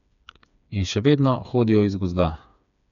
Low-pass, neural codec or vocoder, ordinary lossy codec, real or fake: 7.2 kHz; codec, 16 kHz, 4 kbps, FreqCodec, smaller model; none; fake